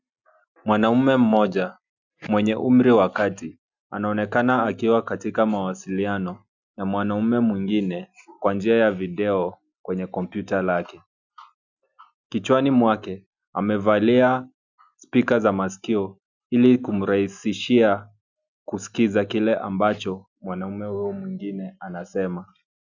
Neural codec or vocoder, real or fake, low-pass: none; real; 7.2 kHz